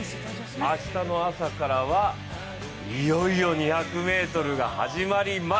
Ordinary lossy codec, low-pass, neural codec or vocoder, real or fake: none; none; none; real